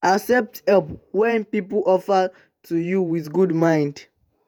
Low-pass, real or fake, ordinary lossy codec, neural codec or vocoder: none; fake; none; vocoder, 48 kHz, 128 mel bands, Vocos